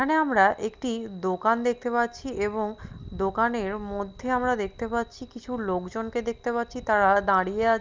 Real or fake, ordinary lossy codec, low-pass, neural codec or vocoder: real; Opus, 24 kbps; 7.2 kHz; none